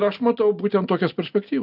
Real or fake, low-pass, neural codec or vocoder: real; 5.4 kHz; none